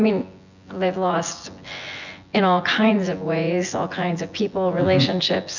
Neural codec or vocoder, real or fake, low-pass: vocoder, 24 kHz, 100 mel bands, Vocos; fake; 7.2 kHz